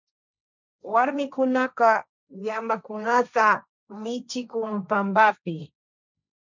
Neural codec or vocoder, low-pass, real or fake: codec, 16 kHz, 1.1 kbps, Voila-Tokenizer; 7.2 kHz; fake